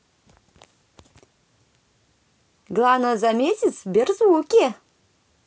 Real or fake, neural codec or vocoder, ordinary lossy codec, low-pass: real; none; none; none